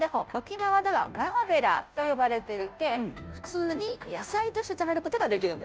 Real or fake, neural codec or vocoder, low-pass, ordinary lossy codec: fake; codec, 16 kHz, 0.5 kbps, FunCodec, trained on Chinese and English, 25 frames a second; none; none